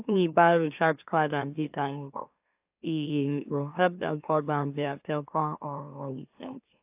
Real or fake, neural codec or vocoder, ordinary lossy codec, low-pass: fake; autoencoder, 44.1 kHz, a latent of 192 numbers a frame, MeloTTS; AAC, 32 kbps; 3.6 kHz